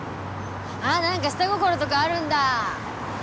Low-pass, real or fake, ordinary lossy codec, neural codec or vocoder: none; real; none; none